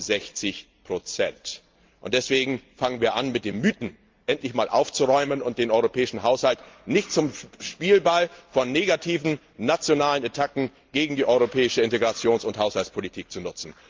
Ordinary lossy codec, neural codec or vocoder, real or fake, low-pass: Opus, 32 kbps; none; real; 7.2 kHz